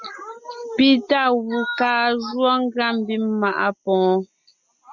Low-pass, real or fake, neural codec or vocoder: 7.2 kHz; real; none